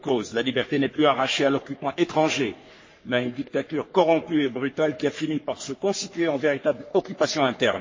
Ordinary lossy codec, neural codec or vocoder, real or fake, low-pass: MP3, 32 kbps; codec, 44.1 kHz, 3.4 kbps, Pupu-Codec; fake; 7.2 kHz